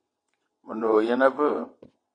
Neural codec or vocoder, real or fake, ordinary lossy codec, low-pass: vocoder, 22.05 kHz, 80 mel bands, WaveNeXt; fake; MP3, 48 kbps; 9.9 kHz